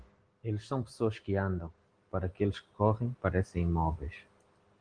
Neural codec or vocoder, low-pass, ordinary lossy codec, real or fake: none; 9.9 kHz; Opus, 24 kbps; real